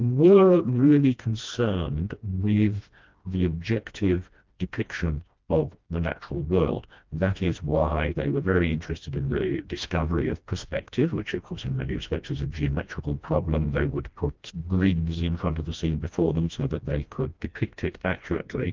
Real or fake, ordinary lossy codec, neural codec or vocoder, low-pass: fake; Opus, 24 kbps; codec, 16 kHz, 1 kbps, FreqCodec, smaller model; 7.2 kHz